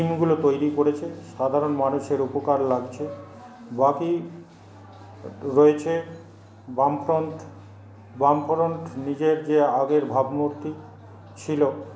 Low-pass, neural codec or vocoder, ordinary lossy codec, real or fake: none; none; none; real